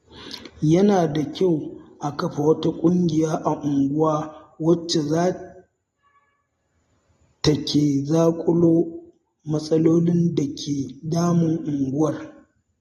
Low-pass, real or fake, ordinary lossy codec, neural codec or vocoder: 19.8 kHz; real; AAC, 32 kbps; none